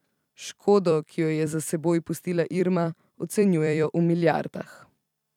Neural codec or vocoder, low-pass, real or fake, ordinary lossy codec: vocoder, 44.1 kHz, 128 mel bands every 256 samples, BigVGAN v2; 19.8 kHz; fake; none